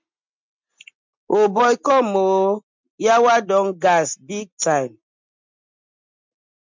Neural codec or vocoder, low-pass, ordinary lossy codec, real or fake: none; 7.2 kHz; MP3, 64 kbps; real